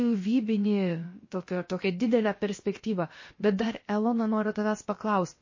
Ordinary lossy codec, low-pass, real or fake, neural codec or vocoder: MP3, 32 kbps; 7.2 kHz; fake; codec, 16 kHz, about 1 kbps, DyCAST, with the encoder's durations